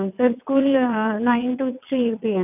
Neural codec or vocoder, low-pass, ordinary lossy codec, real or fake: vocoder, 22.05 kHz, 80 mel bands, WaveNeXt; 3.6 kHz; none; fake